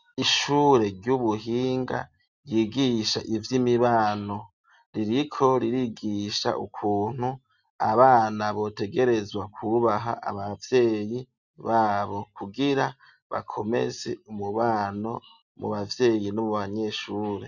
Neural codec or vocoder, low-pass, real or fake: none; 7.2 kHz; real